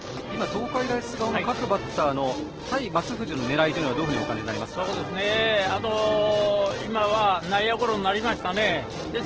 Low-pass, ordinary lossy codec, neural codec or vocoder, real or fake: 7.2 kHz; Opus, 16 kbps; none; real